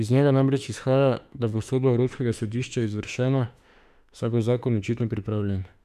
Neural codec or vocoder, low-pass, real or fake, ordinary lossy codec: autoencoder, 48 kHz, 32 numbers a frame, DAC-VAE, trained on Japanese speech; 14.4 kHz; fake; none